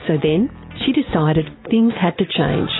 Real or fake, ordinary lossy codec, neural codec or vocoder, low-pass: real; AAC, 16 kbps; none; 7.2 kHz